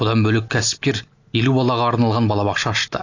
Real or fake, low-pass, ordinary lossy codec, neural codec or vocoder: real; 7.2 kHz; AAC, 48 kbps; none